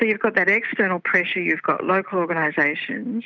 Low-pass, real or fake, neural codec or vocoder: 7.2 kHz; real; none